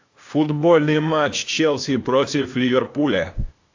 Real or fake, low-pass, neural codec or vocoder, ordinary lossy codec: fake; 7.2 kHz; codec, 16 kHz, 0.8 kbps, ZipCodec; AAC, 48 kbps